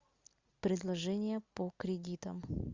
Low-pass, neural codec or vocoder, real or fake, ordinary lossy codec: 7.2 kHz; none; real; Opus, 64 kbps